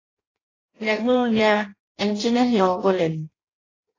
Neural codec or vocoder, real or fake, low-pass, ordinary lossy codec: codec, 16 kHz in and 24 kHz out, 0.6 kbps, FireRedTTS-2 codec; fake; 7.2 kHz; AAC, 32 kbps